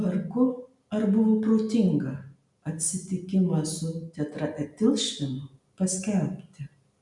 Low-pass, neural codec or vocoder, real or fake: 10.8 kHz; none; real